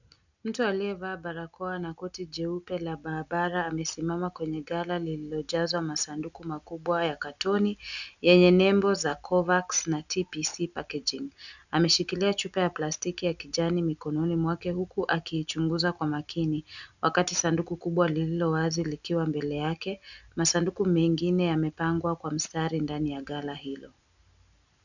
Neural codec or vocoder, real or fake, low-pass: none; real; 7.2 kHz